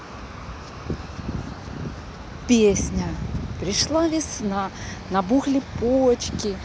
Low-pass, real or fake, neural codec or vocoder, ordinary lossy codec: none; real; none; none